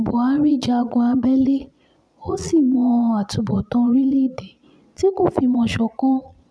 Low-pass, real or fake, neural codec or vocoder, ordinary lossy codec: none; fake; vocoder, 22.05 kHz, 80 mel bands, Vocos; none